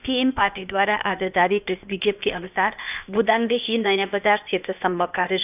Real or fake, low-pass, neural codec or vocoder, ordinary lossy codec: fake; 3.6 kHz; codec, 16 kHz, 0.8 kbps, ZipCodec; none